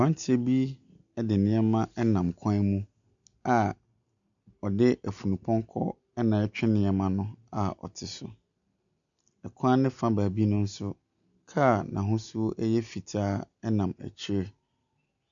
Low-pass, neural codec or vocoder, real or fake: 7.2 kHz; none; real